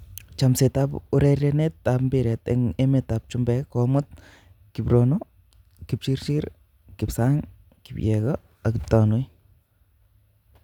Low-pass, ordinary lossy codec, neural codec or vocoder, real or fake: 19.8 kHz; none; none; real